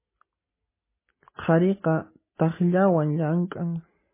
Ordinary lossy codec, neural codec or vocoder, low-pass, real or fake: MP3, 16 kbps; none; 3.6 kHz; real